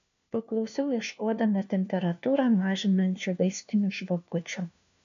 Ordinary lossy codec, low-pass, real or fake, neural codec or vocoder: AAC, 96 kbps; 7.2 kHz; fake; codec, 16 kHz, 1 kbps, FunCodec, trained on LibriTTS, 50 frames a second